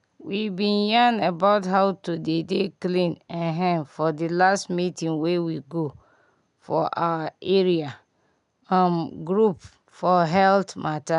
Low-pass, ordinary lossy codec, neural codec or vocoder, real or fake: 10.8 kHz; none; none; real